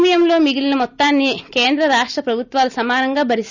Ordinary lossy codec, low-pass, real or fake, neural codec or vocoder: none; 7.2 kHz; real; none